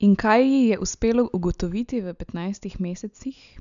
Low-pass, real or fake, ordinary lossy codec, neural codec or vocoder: 7.2 kHz; real; none; none